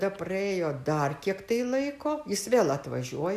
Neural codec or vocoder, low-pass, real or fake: none; 14.4 kHz; real